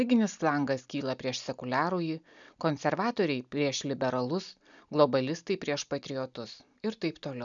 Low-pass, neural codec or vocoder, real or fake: 7.2 kHz; none; real